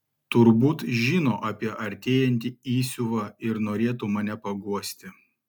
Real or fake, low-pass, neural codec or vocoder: real; 19.8 kHz; none